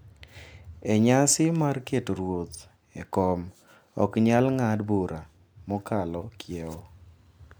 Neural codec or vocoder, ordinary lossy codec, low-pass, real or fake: none; none; none; real